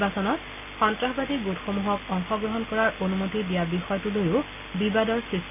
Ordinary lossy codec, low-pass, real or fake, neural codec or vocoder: none; 3.6 kHz; real; none